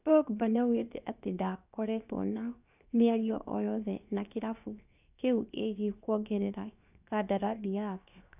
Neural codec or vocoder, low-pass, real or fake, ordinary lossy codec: codec, 16 kHz, 0.7 kbps, FocalCodec; 3.6 kHz; fake; none